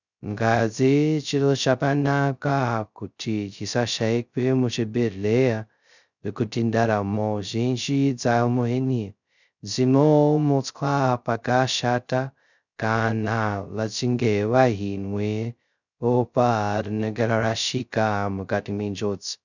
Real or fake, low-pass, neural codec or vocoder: fake; 7.2 kHz; codec, 16 kHz, 0.2 kbps, FocalCodec